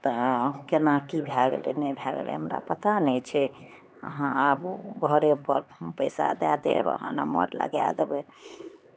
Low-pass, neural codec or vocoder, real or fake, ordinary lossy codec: none; codec, 16 kHz, 4 kbps, X-Codec, HuBERT features, trained on LibriSpeech; fake; none